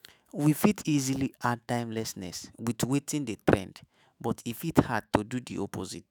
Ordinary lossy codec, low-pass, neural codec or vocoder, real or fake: none; none; autoencoder, 48 kHz, 128 numbers a frame, DAC-VAE, trained on Japanese speech; fake